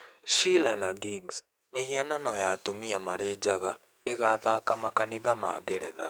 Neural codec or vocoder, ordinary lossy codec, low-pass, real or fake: codec, 44.1 kHz, 2.6 kbps, SNAC; none; none; fake